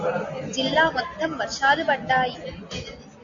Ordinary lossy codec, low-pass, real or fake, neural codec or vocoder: MP3, 64 kbps; 7.2 kHz; real; none